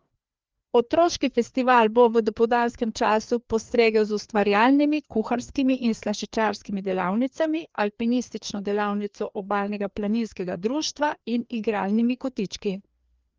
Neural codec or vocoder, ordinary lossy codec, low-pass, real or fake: codec, 16 kHz, 2 kbps, FreqCodec, larger model; Opus, 24 kbps; 7.2 kHz; fake